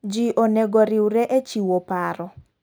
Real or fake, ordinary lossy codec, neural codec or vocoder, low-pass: real; none; none; none